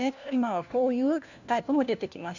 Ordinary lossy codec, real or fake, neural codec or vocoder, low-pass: none; fake; codec, 16 kHz, 1 kbps, FunCodec, trained on LibriTTS, 50 frames a second; 7.2 kHz